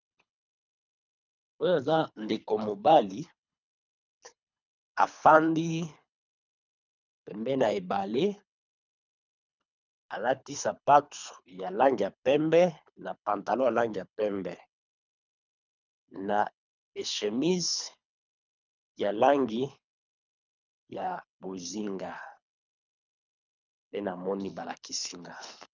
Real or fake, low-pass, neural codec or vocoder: fake; 7.2 kHz; codec, 24 kHz, 3 kbps, HILCodec